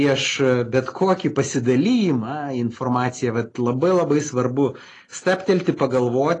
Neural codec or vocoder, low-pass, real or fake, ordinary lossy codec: none; 10.8 kHz; real; AAC, 32 kbps